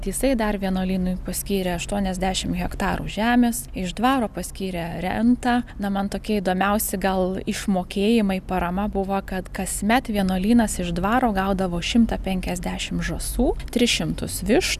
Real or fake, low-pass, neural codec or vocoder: real; 14.4 kHz; none